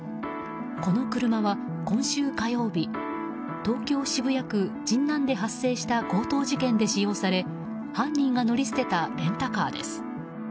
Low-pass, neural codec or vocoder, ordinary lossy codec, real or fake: none; none; none; real